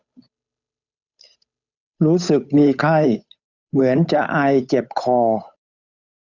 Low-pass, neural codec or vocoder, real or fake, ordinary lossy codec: 7.2 kHz; codec, 16 kHz, 8 kbps, FunCodec, trained on Chinese and English, 25 frames a second; fake; none